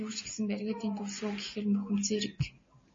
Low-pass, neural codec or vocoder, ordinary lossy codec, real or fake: 7.2 kHz; none; MP3, 32 kbps; real